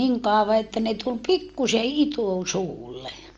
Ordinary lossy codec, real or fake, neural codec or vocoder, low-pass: Opus, 24 kbps; real; none; 7.2 kHz